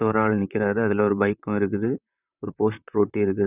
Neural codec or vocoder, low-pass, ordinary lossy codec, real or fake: vocoder, 44.1 kHz, 128 mel bands, Pupu-Vocoder; 3.6 kHz; none; fake